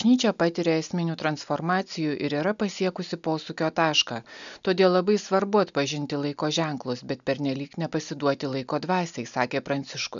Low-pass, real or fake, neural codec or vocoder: 7.2 kHz; real; none